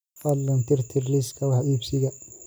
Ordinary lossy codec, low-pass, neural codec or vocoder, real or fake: none; none; none; real